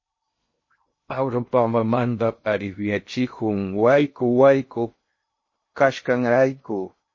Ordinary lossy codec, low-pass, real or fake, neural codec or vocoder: MP3, 32 kbps; 7.2 kHz; fake; codec, 16 kHz in and 24 kHz out, 0.6 kbps, FocalCodec, streaming, 2048 codes